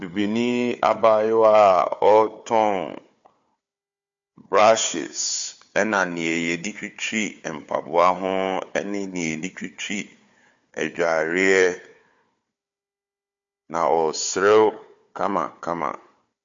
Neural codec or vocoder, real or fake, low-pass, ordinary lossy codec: codec, 16 kHz, 4 kbps, FunCodec, trained on Chinese and English, 50 frames a second; fake; 7.2 kHz; MP3, 48 kbps